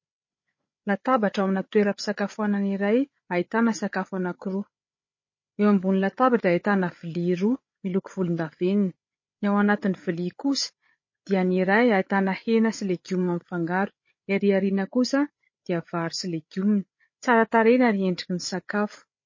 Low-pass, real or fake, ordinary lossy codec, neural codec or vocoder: 7.2 kHz; fake; MP3, 32 kbps; codec, 16 kHz, 8 kbps, FreqCodec, larger model